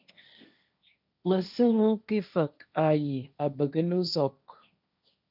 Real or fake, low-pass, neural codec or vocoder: fake; 5.4 kHz; codec, 16 kHz, 1.1 kbps, Voila-Tokenizer